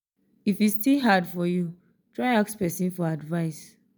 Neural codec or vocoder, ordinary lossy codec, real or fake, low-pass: none; none; real; none